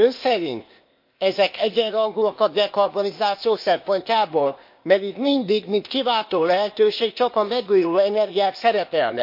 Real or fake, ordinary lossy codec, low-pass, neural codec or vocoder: fake; MP3, 32 kbps; 5.4 kHz; codec, 16 kHz, 0.8 kbps, ZipCodec